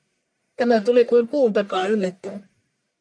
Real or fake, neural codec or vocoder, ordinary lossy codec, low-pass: fake; codec, 44.1 kHz, 1.7 kbps, Pupu-Codec; MP3, 96 kbps; 9.9 kHz